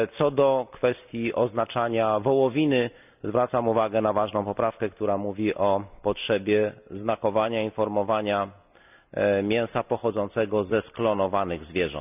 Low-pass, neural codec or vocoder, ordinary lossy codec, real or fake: 3.6 kHz; none; none; real